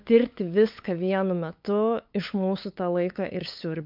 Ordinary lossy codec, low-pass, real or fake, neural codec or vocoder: MP3, 48 kbps; 5.4 kHz; fake; codec, 16 kHz, 8 kbps, FunCodec, trained on LibriTTS, 25 frames a second